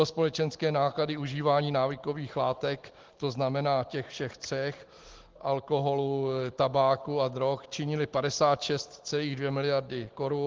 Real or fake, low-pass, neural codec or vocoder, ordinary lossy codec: real; 7.2 kHz; none; Opus, 16 kbps